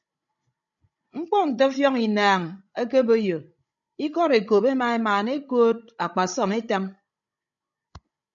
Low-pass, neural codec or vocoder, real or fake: 7.2 kHz; codec, 16 kHz, 16 kbps, FreqCodec, larger model; fake